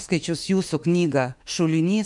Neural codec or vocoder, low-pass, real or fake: autoencoder, 48 kHz, 32 numbers a frame, DAC-VAE, trained on Japanese speech; 10.8 kHz; fake